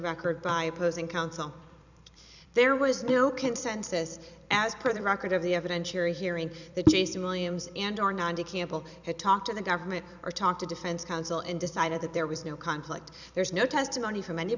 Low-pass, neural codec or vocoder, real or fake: 7.2 kHz; vocoder, 44.1 kHz, 128 mel bands every 256 samples, BigVGAN v2; fake